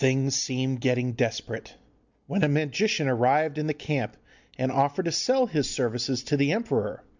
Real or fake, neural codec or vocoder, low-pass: real; none; 7.2 kHz